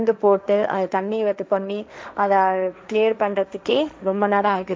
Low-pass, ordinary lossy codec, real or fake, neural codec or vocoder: none; none; fake; codec, 16 kHz, 1.1 kbps, Voila-Tokenizer